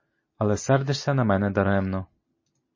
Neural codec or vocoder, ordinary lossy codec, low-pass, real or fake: none; MP3, 32 kbps; 7.2 kHz; real